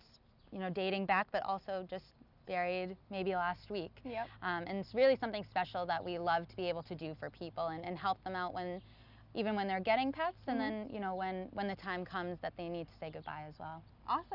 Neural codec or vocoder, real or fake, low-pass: none; real; 5.4 kHz